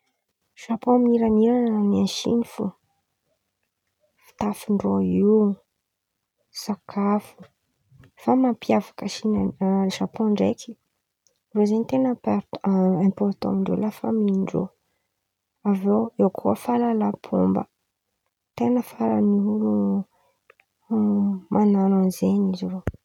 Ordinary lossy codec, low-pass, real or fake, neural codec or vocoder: none; 19.8 kHz; real; none